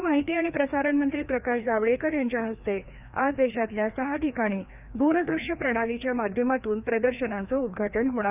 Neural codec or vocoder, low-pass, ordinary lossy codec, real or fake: codec, 16 kHz, 2 kbps, FreqCodec, larger model; 3.6 kHz; none; fake